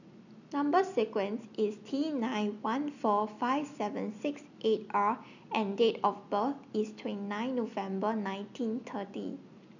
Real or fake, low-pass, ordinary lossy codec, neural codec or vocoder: real; 7.2 kHz; none; none